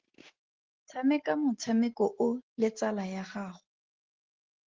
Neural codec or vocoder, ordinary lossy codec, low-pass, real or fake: none; Opus, 16 kbps; 7.2 kHz; real